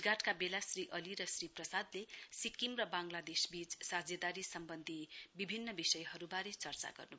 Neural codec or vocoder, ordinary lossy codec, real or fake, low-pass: none; none; real; none